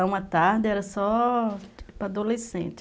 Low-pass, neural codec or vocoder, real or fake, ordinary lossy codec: none; none; real; none